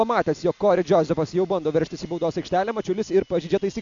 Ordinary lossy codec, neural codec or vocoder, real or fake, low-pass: MP3, 64 kbps; none; real; 7.2 kHz